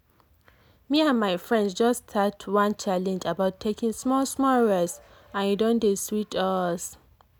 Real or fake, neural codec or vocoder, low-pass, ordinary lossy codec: real; none; none; none